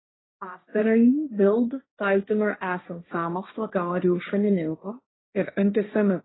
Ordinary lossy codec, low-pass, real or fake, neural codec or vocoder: AAC, 16 kbps; 7.2 kHz; fake; codec, 16 kHz, 1.1 kbps, Voila-Tokenizer